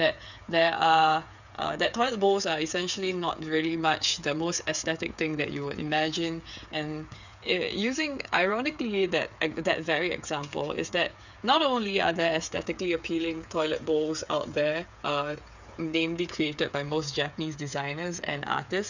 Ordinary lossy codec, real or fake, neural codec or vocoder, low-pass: none; fake; codec, 16 kHz, 8 kbps, FreqCodec, smaller model; 7.2 kHz